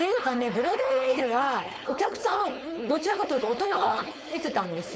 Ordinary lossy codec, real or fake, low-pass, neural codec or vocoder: none; fake; none; codec, 16 kHz, 4.8 kbps, FACodec